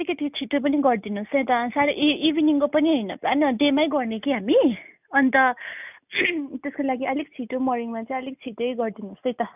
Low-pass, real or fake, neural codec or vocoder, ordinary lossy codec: 3.6 kHz; real; none; none